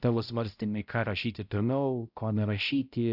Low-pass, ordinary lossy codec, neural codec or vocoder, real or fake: 5.4 kHz; Opus, 64 kbps; codec, 16 kHz, 0.5 kbps, X-Codec, HuBERT features, trained on balanced general audio; fake